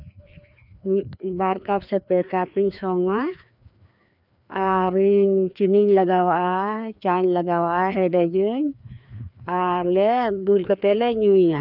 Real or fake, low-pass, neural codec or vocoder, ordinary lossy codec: fake; 5.4 kHz; codec, 16 kHz, 2 kbps, FreqCodec, larger model; none